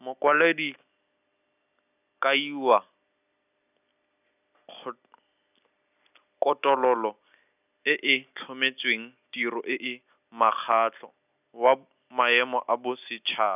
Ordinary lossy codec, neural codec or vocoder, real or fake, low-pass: none; none; real; 3.6 kHz